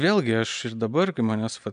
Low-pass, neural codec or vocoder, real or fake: 9.9 kHz; none; real